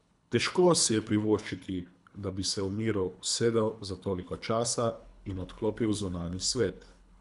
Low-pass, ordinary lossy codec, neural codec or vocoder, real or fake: 10.8 kHz; none; codec, 24 kHz, 3 kbps, HILCodec; fake